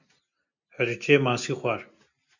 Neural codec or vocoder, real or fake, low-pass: none; real; 7.2 kHz